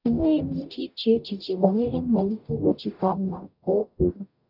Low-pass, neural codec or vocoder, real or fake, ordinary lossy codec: 5.4 kHz; codec, 44.1 kHz, 0.9 kbps, DAC; fake; none